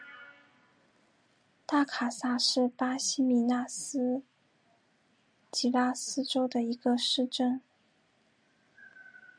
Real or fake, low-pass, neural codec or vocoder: real; 9.9 kHz; none